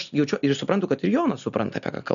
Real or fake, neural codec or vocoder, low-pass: real; none; 7.2 kHz